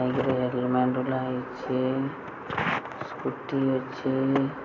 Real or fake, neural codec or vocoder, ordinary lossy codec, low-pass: real; none; AAC, 48 kbps; 7.2 kHz